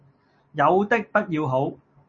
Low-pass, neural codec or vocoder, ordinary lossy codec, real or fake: 7.2 kHz; none; MP3, 32 kbps; real